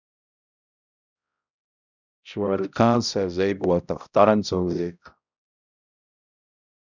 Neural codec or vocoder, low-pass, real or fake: codec, 16 kHz, 0.5 kbps, X-Codec, HuBERT features, trained on balanced general audio; 7.2 kHz; fake